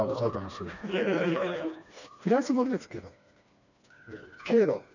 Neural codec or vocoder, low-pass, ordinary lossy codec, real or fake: codec, 16 kHz, 2 kbps, FreqCodec, smaller model; 7.2 kHz; none; fake